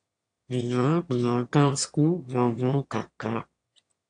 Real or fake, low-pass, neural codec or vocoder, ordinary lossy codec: fake; 9.9 kHz; autoencoder, 22.05 kHz, a latent of 192 numbers a frame, VITS, trained on one speaker; AAC, 64 kbps